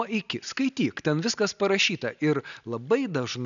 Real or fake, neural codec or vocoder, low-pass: real; none; 7.2 kHz